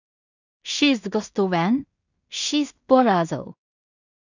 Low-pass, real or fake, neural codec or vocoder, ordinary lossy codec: 7.2 kHz; fake; codec, 16 kHz in and 24 kHz out, 0.4 kbps, LongCat-Audio-Codec, two codebook decoder; none